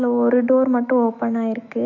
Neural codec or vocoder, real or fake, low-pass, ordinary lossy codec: autoencoder, 48 kHz, 128 numbers a frame, DAC-VAE, trained on Japanese speech; fake; 7.2 kHz; none